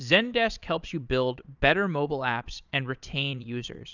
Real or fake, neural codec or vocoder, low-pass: fake; vocoder, 22.05 kHz, 80 mel bands, Vocos; 7.2 kHz